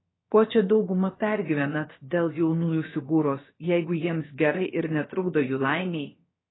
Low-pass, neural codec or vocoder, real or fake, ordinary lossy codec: 7.2 kHz; codec, 16 kHz, about 1 kbps, DyCAST, with the encoder's durations; fake; AAC, 16 kbps